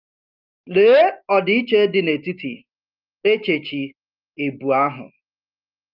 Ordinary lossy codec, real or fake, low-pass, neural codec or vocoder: Opus, 24 kbps; real; 5.4 kHz; none